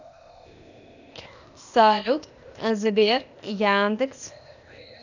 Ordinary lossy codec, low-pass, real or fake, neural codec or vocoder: none; 7.2 kHz; fake; codec, 16 kHz, 0.8 kbps, ZipCodec